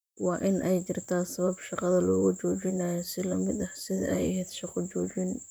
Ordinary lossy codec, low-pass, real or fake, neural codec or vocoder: none; none; fake; vocoder, 44.1 kHz, 128 mel bands, Pupu-Vocoder